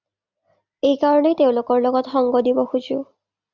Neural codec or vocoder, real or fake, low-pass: none; real; 7.2 kHz